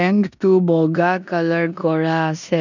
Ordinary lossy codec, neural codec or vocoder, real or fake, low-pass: none; codec, 16 kHz in and 24 kHz out, 0.9 kbps, LongCat-Audio-Codec, four codebook decoder; fake; 7.2 kHz